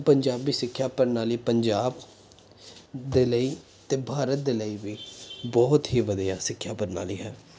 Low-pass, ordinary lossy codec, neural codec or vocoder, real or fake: none; none; none; real